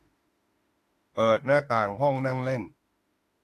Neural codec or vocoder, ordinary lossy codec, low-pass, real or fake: autoencoder, 48 kHz, 32 numbers a frame, DAC-VAE, trained on Japanese speech; AAC, 48 kbps; 14.4 kHz; fake